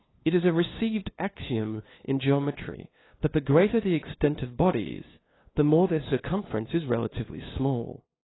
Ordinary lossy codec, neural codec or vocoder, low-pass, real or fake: AAC, 16 kbps; codec, 16 kHz, 2 kbps, FunCodec, trained on LibriTTS, 25 frames a second; 7.2 kHz; fake